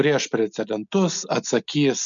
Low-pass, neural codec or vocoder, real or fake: 7.2 kHz; none; real